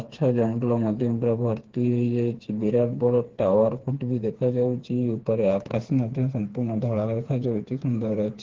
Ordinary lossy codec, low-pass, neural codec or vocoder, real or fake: Opus, 16 kbps; 7.2 kHz; codec, 16 kHz, 4 kbps, FreqCodec, smaller model; fake